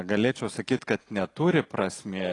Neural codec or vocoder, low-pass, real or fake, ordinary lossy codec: none; 10.8 kHz; real; AAC, 32 kbps